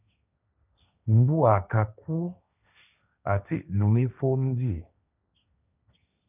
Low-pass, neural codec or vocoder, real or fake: 3.6 kHz; codec, 16 kHz, 1.1 kbps, Voila-Tokenizer; fake